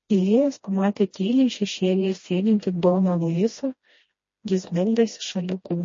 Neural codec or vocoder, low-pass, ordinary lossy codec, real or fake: codec, 16 kHz, 1 kbps, FreqCodec, smaller model; 7.2 kHz; MP3, 32 kbps; fake